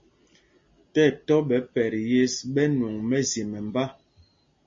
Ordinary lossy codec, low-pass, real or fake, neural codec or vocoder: MP3, 32 kbps; 7.2 kHz; real; none